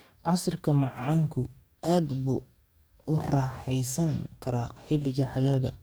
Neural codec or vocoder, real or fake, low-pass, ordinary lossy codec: codec, 44.1 kHz, 2.6 kbps, DAC; fake; none; none